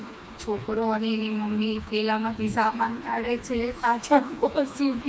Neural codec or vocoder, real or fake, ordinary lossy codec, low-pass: codec, 16 kHz, 2 kbps, FreqCodec, smaller model; fake; none; none